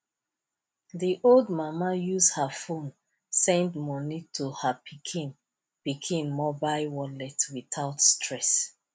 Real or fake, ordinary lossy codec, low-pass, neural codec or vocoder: real; none; none; none